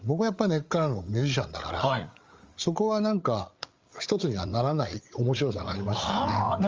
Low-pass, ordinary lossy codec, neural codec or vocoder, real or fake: 7.2 kHz; Opus, 32 kbps; codec, 16 kHz, 8 kbps, FreqCodec, larger model; fake